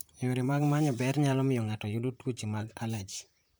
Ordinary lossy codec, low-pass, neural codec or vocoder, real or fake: none; none; vocoder, 44.1 kHz, 128 mel bands, Pupu-Vocoder; fake